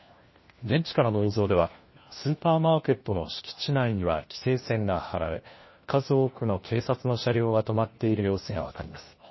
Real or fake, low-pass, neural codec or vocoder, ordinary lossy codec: fake; 7.2 kHz; codec, 16 kHz, 1 kbps, FunCodec, trained on LibriTTS, 50 frames a second; MP3, 24 kbps